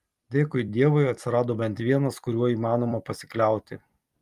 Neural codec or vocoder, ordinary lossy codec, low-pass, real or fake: vocoder, 44.1 kHz, 128 mel bands every 256 samples, BigVGAN v2; Opus, 32 kbps; 14.4 kHz; fake